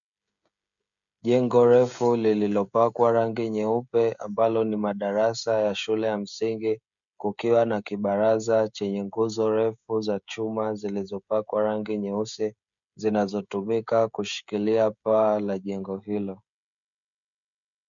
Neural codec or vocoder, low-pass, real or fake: codec, 16 kHz, 16 kbps, FreqCodec, smaller model; 7.2 kHz; fake